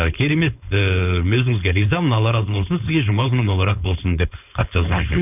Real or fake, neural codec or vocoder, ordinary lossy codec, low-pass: fake; codec, 16 kHz, 4.8 kbps, FACodec; none; 3.6 kHz